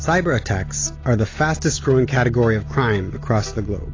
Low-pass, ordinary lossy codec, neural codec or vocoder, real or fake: 7.2 kHz; AAC, 32 kbps; none; real